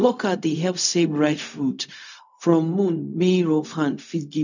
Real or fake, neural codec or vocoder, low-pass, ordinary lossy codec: fake; codec, 16 kHz, 0.4 kbps, LongCat-Audio-Codec; 7.2 kHz; none